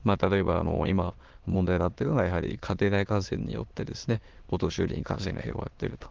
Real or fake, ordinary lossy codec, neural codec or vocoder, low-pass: fake; Opus, 16 kbps; autoencoder, 22.05 kHz, a latent of 192 numbers a frame, VITS, trained on many speakers; 7.2 kHz